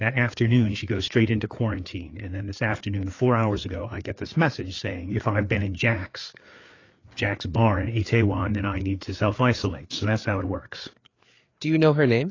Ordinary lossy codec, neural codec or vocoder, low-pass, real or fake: AAC, 32 kbps; codec, 16 kHz, 4 kbps, FreqCodec, larger model; 7.2 kHz; fake